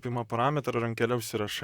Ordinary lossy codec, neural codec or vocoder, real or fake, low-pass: Opus, 64 kbps; vocoder, 44.1 kHz, 128 mel bands, Pupu-Vocoder; fake; 19.8 kHz